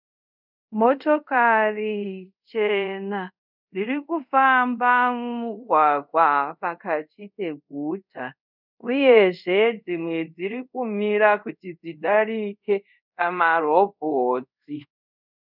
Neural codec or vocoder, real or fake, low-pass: codec, 24 kHz, 0.5 kbps, DualCodec; fake; 5.4 kHz